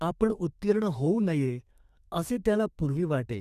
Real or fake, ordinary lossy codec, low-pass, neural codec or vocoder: fake; none; 14.4 kHz; codec, 32 kHz, 1.9 kbps, SNAC